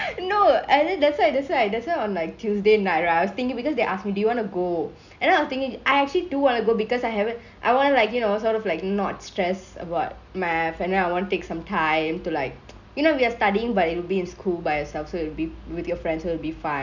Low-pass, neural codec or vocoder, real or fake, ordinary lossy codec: 7.2 kHz; none; real; none